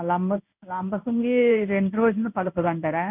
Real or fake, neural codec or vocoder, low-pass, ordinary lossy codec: fake; codec, 16 kHz in and 24 kHz out, 1 kbps, XY-Tokenizer; 3.6 kHz; none